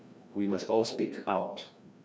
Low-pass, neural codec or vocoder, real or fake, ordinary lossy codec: none; codec, 16 kHz, 1 kbps, FreqCodec, larger model; fake; none